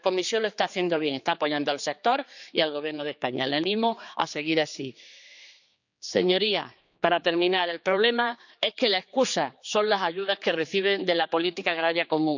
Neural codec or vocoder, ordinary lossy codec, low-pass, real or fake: codec, 16 kHz, 4 kbps, X-Codec, HuBERT features, trained on general audio; none; 7.2 kHz; fake